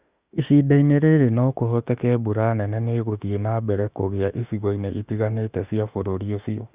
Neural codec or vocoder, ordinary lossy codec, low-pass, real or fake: autoencoder, 48 kHz, 32 numbers a frame, DAC-VAE, trained on Japanese speech; Opus, 64 kbps; 3.6 kHz; fake